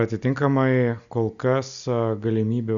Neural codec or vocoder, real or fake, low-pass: none; real; 7.2 kHz